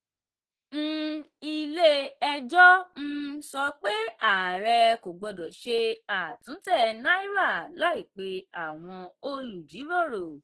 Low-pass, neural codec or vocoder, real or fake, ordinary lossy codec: 10.8 kHz; autoencoder, 48 kHz, 32 numbers a frame, DAC-VAE, trained on Japanese speech; fake; Opus, 16 kbps